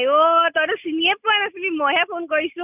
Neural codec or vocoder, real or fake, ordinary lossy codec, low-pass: none; real; none; 3.6 kHz